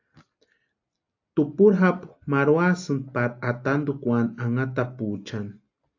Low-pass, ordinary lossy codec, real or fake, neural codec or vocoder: 7.2 kHz; AAC, 48 kbps; real; none